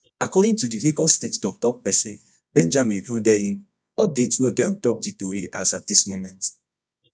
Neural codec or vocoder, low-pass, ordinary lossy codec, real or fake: codec, 24 kHz, 0.9 kbps, WavTokenizer, medium music audio release; 9.9 kHz; none; fake